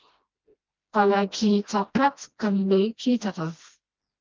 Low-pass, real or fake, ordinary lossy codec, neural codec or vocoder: 7.2 kHz; fake; Opus, 32 kbps; codec, 16 kHz, 1 kbps, FreqCodec, smaller model